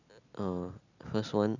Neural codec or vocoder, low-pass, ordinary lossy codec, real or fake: none; 7.2 kHz; none; real